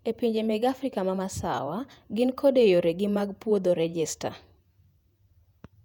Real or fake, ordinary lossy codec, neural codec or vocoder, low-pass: fake; none; vocoder, 48 kHz, 128 mel bands, Vocos; 19.8 kHz